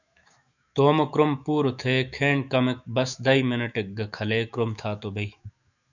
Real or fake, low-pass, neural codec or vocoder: fake; 7.2 kHz; autoencoder, 48 kHz, 128 numbers a frame, DAC-VAE, trained on Japanese speech